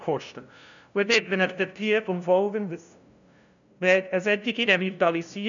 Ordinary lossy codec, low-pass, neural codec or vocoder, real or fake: none; 7.2 kHz; codec, 16 kHz, 0.5 kbps, FunCodec, trained on LibriTTS, 25 frames a second; fake